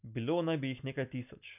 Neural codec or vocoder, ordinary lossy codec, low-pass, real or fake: none; none; 3.6 kHz; real